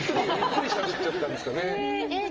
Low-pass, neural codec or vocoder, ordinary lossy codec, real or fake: 7.2 kHz; none; Opus, 24 kbps; real